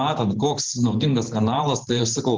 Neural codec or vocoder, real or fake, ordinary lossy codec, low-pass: vocoder, 44.1 kHz, 128 mel bands every 512 samples, BigVGAN v2; fake; Opus, 16 kbps; 7.2 kHz